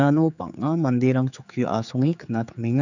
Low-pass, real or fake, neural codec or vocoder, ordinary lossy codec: 7.2 kHz; fake; codec, 16 kHz, 4 kbps, X-Codec, HuBERT features, trained on general audio; none